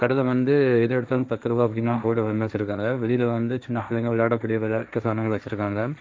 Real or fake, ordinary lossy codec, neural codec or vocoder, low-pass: fake; none; codec, 16 kHz, 1.1 kbps, Voila-Tokenizer; none